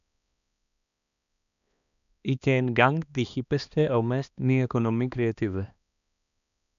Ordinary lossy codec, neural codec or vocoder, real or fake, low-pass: none; codec, 16 kHz, 2 kbps, X-Codec, HuBERT features, trained on balanced general audio; fake; 7.2 kHz